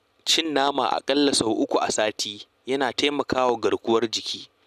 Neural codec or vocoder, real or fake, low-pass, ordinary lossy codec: none; real; 14.4 kHz; none